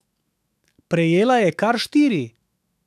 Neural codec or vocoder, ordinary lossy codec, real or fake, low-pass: autoencoder, 48 kHz, 128 numbers a frame, DAC-VAE, trained on Japanese speech; none; fake; 14.4 kHz